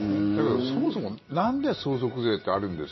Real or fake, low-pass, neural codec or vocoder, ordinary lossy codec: fake; 7.2 kHz; vocoder, 44.1 kHz, 128 mel bands every 512 samples, BigVGAN v2; MP3, 24 kbps